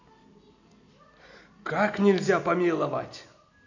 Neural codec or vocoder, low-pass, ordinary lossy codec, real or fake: none; 7.2 kHz; AAC, 48 kbps; real